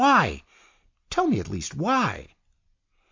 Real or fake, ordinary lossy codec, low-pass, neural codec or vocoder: real; MP3, 48 kbps; 7.2 kHz; none